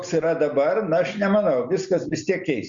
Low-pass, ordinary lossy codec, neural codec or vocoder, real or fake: 7.2 kHz; Opus, 64 kbps; none; real